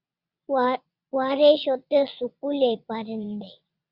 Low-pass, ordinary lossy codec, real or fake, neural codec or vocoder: 5.4 kHz; Opus, 64 kbps; fake; vocoder, 22.05 kHz, 80 mel bands, WaveNeXt